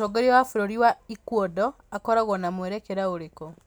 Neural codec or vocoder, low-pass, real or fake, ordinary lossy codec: none; none; real; none